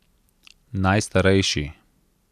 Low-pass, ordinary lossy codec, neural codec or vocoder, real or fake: 14.4 kHz; none; none; real